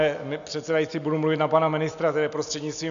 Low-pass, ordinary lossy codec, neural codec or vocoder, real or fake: 7.2 kHz; MP3, 96 kbps; none; real